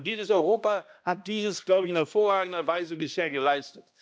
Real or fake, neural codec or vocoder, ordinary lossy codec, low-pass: fake; codec, 16 kHz, 1 kbps, X-Codec, HuBERT features, trained on balanced general audio; none; none